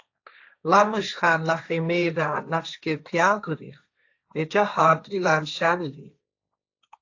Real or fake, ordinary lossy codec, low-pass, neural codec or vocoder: fake; AAC, 48 kbps; 7.2 kHz; codec, 16 kHz, 1.1 kbps, Voila-Tokenizer